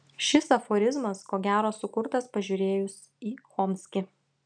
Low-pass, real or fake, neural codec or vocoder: 9.9 kHz; real; none